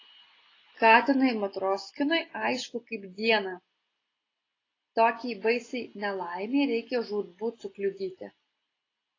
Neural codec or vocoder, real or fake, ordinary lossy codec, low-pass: none; real; AAC, 32 kbps; 7.2 kHz